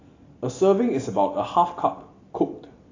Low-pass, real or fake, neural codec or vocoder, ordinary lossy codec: 7.2 kHz; real; none; AAC, 32 kbps